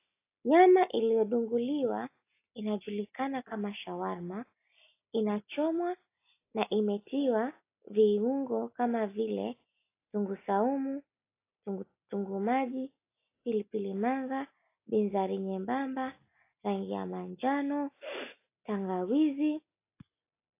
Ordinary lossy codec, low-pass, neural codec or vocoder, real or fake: AAC, 24 kbps; 3.6 kHz; none; real